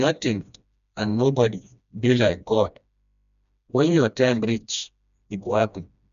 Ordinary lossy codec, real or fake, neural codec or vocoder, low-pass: none; fake; codec, 16 kHz, 1 kbps, FreqCodec, smaller model; 7.2 kHz